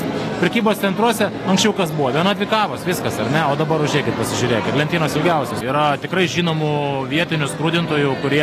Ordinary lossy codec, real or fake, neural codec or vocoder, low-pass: AAC, 48 kbps; real; none; 14.4 kHz